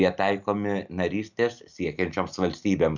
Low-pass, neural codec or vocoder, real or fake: 7.2 kHz; none; real